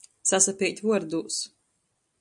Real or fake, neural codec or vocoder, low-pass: real; none; 10.8 kHz